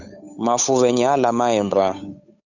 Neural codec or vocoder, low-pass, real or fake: codec, 16 kHz, 8 kbps, FunCodec, trained on Chinese and English, 25 frames a second; 7.2 kHz; fake